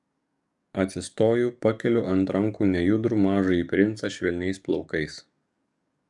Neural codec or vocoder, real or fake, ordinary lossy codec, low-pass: codec, 44.1 kHz, 7.8 kbps, DAC; fake; MP3, 96 kbps; 10.8 kHz